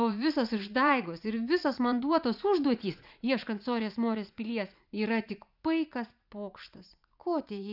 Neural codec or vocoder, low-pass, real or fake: vocoder, 44.1 kHz, 80 mel bands, Vocos; 5.4 kHz; fake